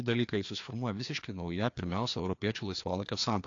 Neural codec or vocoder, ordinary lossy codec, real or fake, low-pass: codec, 16 kHz, 2 kbps, FreqCodec, larger model; AAC, 48 kbps; fake; 7.2 kHz